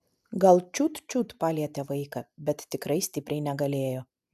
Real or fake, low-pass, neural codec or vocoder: real; 14.4 kHz; none